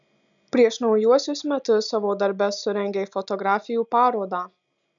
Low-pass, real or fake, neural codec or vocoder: 7.2 kHz; real; none